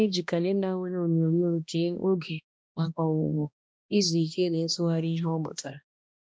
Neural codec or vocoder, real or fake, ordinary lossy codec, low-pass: codec, 16 kHz, 1 kbps, X-Codec, HuBERT features, trained on balanced general audio; fake; none; none